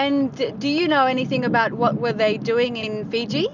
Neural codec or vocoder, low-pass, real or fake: none; 7.2 kHz; real